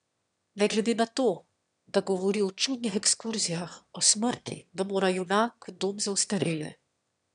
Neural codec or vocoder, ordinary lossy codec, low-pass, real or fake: autoencoder, 22.05 kHz, a latent of 192 numbers a frame, VITS, trained on one speaker; none; 9.9 kHz; fake